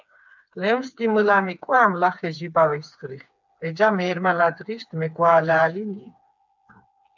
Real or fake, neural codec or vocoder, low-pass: fake; codec, 16 kHz, 4 kbps, FreqCodec, smaller model; 7.2 kHz